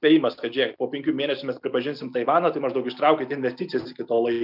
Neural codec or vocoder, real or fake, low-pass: none; real; 5.4 kHz